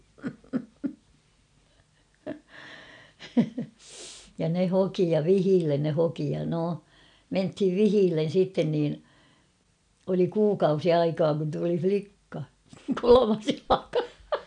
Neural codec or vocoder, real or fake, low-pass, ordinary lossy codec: none; real; 9.9 kHz; none